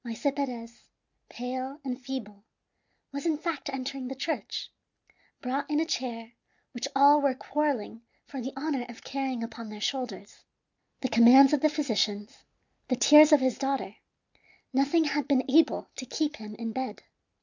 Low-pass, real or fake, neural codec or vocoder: 7.2 kHz; real; none